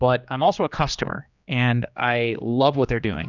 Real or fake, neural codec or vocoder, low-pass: fake; codec, 16 kHz, 2 kbps, X-Codec, HuBERT features, trained on general audio; 7.2 kHz